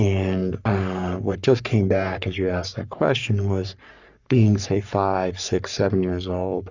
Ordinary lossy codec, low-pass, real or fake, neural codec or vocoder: Opus, 64 kbps; 7.2 kHz; fake; codec, 44.1 kHz, 3.4 kbps, Pupu-Codec